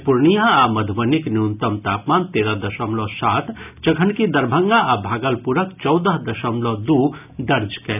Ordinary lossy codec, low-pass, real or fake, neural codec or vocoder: none; 3.6 kHz; real; none